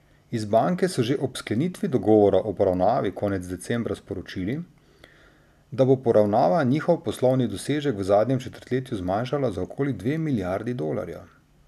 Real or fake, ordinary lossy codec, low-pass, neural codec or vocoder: real; none; 14.4 kHz; none